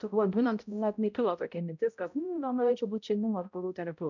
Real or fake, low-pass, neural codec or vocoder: fake; 7.2 kHz; codec, 16 kHz, 0.5 kbps, X-Codec, HuBERT features, trained on balanced general audio